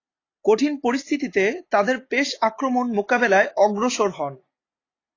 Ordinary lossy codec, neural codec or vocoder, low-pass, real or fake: AAC, 32 kbps; none; 7.2 kHz; real